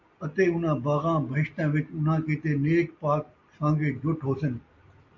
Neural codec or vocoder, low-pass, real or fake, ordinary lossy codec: none; 7.2 kHz; real; MP3, 64 kbps